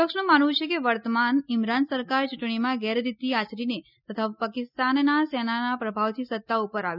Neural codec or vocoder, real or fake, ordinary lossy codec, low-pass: none; real; none; 5.4 kHz